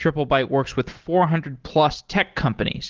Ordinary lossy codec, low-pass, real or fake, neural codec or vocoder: Opus, 16 kbps; 7.2 kHz; real; none